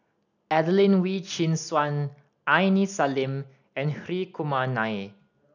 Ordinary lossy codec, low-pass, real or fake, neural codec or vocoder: none; 7.2 kHz; real; none